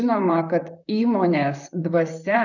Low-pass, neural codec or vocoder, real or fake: 7.2 kHz; vocoder, 44.1 kHz, 128 mel bands, Pupu-Vocoder; fake